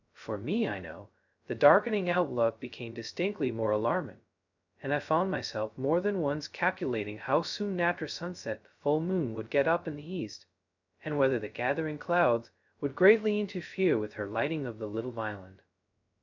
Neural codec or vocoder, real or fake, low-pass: codec, 16 kHz, 0.2 kbps, FocalCodec; fake; 7.2 kHz